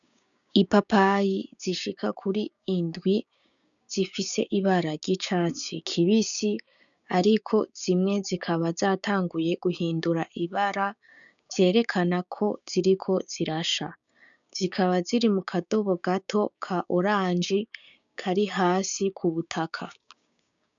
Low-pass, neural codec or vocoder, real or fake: 7.2 kHz; codec, 16 kHz, 6 kbps, DAC; fake